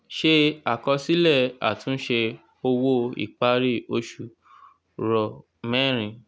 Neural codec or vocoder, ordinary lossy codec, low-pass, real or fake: none; none; none; real